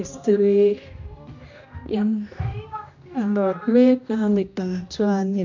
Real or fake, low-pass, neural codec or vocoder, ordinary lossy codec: fake; 7.2 kHz; codec, 16 kHz, 1 kbps, X-Codec, HuBERT features, trained on general audio; MP3, 64 kbps